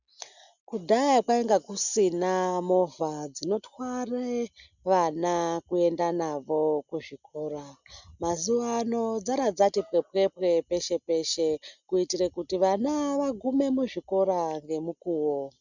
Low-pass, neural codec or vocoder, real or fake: 7.2 kHz; none; real